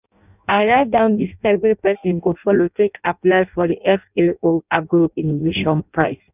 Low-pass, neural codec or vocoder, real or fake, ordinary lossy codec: 3.6 kHz; codec, 16 kHz in and 24 kHz out, 0.6 kbps, FireRedTTS-2 codec; fake; none